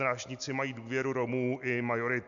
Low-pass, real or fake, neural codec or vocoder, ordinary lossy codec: 7.2 kHz; real; none; AAC, 64 kbps